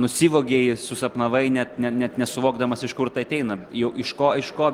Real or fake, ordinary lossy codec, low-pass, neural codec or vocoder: real; Opus, 24 kbps; 19.8 kHz; none